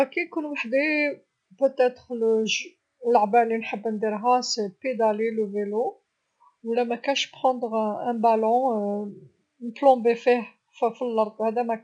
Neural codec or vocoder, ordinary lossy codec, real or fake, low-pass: none; none; real; 9.9 kHz